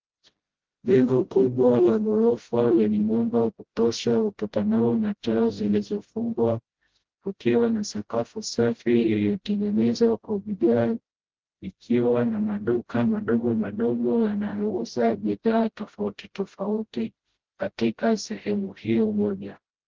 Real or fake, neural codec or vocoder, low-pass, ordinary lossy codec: fake; codec, 16 kHz, 0.5 kbps, FreqCodec, smaller model; 7.2 kHz; Opus, 16 kbps